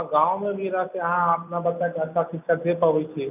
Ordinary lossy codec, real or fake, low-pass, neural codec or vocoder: none; real; 3.6 kHz; none